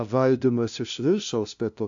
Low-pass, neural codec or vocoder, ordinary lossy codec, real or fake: 7.2 kHz; codec, 16 kHz, 0.5 kbps, FunCodec, trained on LibriTTS, 25 frames a second; AAC, 64 kbps; fake